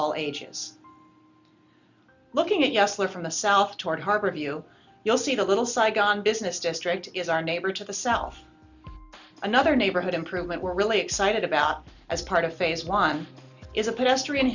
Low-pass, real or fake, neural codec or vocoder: 7.2 kHz; real; none